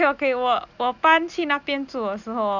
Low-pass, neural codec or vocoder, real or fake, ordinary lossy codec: 7.2 kHz; none; real; none